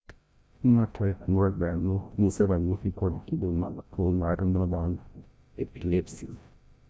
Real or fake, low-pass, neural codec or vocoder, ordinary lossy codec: fake; none; codec, 16 kHz, 0.5 kbps, FreqCodec, larger model; none